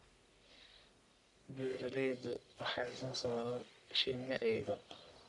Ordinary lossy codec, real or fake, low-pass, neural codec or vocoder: none; fake; 10.8 kHz; codec, 44.1 kHz, 1.7 kbps, Pupu-Codec